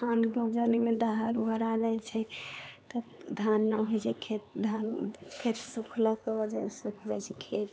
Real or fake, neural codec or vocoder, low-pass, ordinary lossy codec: fake; codec, 16 kHz, 4 kbps, X-Codec, HuBERT features, trained on LibriSpeech; none; none